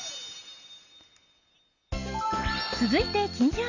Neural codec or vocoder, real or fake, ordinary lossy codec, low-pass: none; real; none; 7.2 kHz